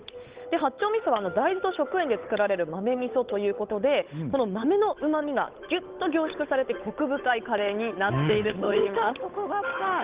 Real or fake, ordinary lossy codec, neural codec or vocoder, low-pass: fake; Opus, 32 kbps; codec, 16 kHz, 8 kbps, FunCodec, trained on Chinese and English, 25 frames a second; 3.6 kHz